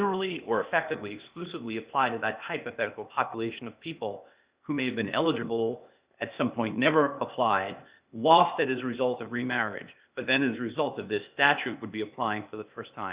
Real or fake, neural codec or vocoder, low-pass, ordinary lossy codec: fake; codec, 16 kHz, 0.8 kbps, ZipCodec; 3.6 kHz; Opus, 64 kbps